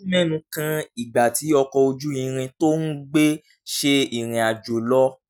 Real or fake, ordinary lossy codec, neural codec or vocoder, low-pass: real; none; none; none